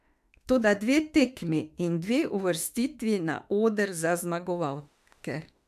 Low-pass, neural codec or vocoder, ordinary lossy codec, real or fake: 14.4 kHz; autoencoder, 48 kHz, 32 numbers a frame, DAC-VAE, trained on Japanese speech; MP3, 96 kbps; fake